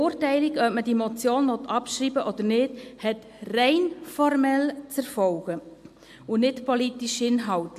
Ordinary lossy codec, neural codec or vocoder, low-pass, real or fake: MP3, 64 kbps; none; 14.4 kHz; real